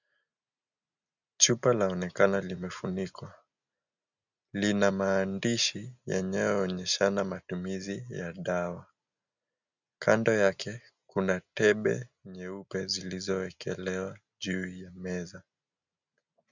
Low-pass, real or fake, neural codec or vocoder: 7.2 kHz; real; none